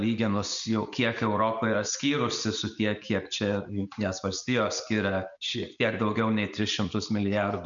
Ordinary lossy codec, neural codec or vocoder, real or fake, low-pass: MP3, 64 kbps; none; real; 7.2 kHz